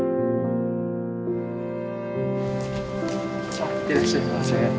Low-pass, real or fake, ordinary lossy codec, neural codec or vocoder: none; real; none; none